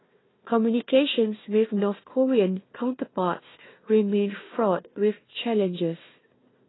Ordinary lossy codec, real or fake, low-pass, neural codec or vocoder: AAC, 16 kbps; fake; 7.2 kHz; codec, 16 kHz, 1 kbps, FunCodec, trained on Chinese and English, 50 frames a second